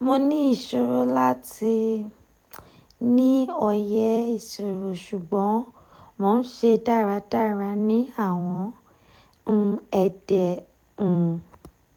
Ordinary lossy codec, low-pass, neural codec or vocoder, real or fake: none; 19.8 kHz; vocoder, 44.1 kHz, 128 mel bands every 256 samples, BigVGAN v2; fake